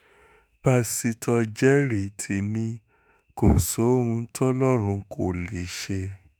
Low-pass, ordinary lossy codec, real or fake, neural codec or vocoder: none; none; fake; autoencoder, 48 kHz, 32 numbers a frame, DAC-VAE, trained on Japanese speech